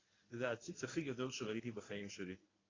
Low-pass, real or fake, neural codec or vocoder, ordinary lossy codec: 7.2 kHz; fake; codec, 24 kHz, 0.9 kbps, WavTokenizer, medium speech release version 1; AAC, 32 kbps